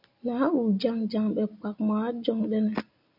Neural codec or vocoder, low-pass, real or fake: none; 5.4 kHz; real